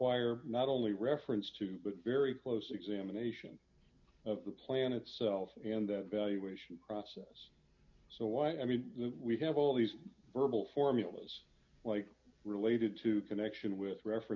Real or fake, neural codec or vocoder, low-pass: real; none; 7.2 kHz